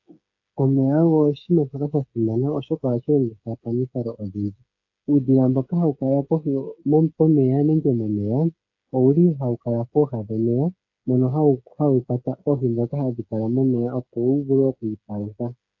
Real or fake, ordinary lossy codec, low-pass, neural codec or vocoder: fake; AAC, 48 kbps; 7.2 kHz; codec, 16 kHz, 8 kbps, FreqCodec, smaller model